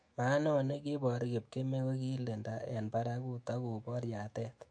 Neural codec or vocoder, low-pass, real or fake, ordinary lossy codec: autoencoder, 48 kHz, 128 numbers a frame, DAC-VAE, trained on Japanese speech; 9.9 kHz; fake; MP3, 48 kbps